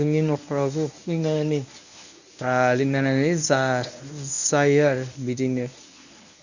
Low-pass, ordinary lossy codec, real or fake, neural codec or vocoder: 7.2 kHz; none; fake; codec, 24 kHz, 0.9 kbps, WavTokenizer, medium speech release version 1